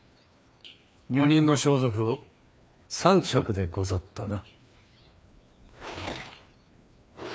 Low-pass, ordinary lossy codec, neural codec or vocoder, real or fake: none; none; codec, 16 kHz, 2 kbps, FreqCodec, larger model; fake